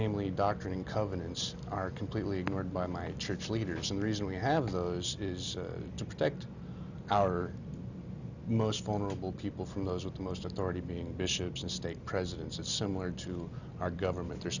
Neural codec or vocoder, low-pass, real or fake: none; 7.2 kHz; real